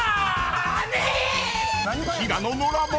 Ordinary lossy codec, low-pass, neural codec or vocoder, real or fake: none; none; none; real